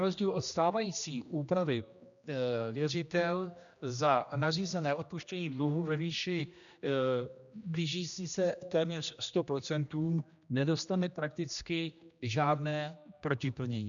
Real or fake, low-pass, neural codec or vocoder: fake; 7.2 kHz; codec, 16 kHz, 1 kbps, X-Codec, HuBERT features, trained on general audio